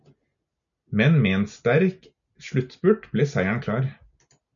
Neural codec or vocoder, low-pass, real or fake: none; 7.2 kHz; real